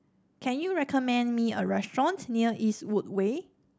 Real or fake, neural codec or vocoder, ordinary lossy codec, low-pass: real; none; none; none